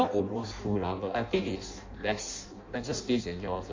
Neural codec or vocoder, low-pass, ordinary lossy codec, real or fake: codec, 16 kHz in and 24 kHz out, 0.6 kbps, FireRedTTS-2 codec; 7.2 kHz; MP3, 48 kbps; fake